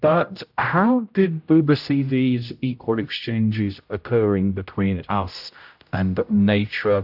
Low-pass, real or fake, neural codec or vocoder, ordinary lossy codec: 5.4 kHz; fake; codec, 16 kHz, 0.5 kbps, X-Codec, HuBERT features, trained on general audio; AAC, 48 kbps